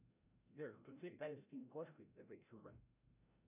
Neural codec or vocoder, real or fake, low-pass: codec, 16 kHz, 0.5 kbps, FreqCodec, larger model; fake; 3.6 kHz